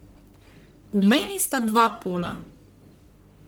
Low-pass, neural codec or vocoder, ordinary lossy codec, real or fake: none; codec, 44.1 kHz, 1.7 kbps, Pupu-Codec; none; fake